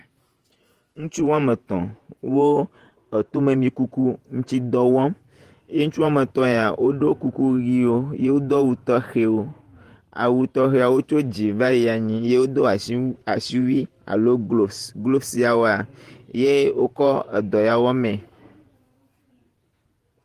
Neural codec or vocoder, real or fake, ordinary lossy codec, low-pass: vocoder, 48 kHz, 128 mel bands, Vocos; fake; Opus, 24 kbps; 14.4 kHz